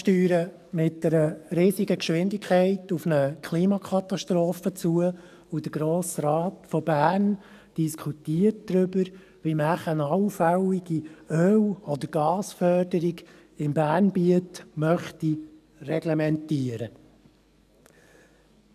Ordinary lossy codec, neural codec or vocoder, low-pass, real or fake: none; codec, 44.1 kHz, 7.8 kbps, DAC; 14.4 kHz; fake